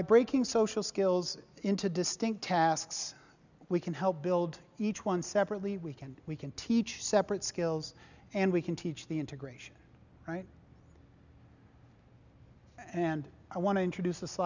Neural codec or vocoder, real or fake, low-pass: none; real; 7.2 kHz